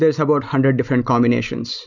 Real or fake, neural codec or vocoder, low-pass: real; none; 7.2 kHz